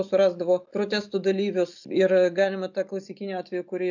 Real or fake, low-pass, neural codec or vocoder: real; 7.2 kHz; none